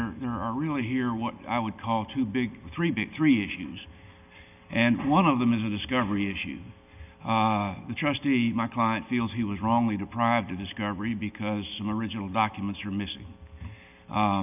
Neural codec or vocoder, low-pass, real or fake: none; 3.6 kHz; real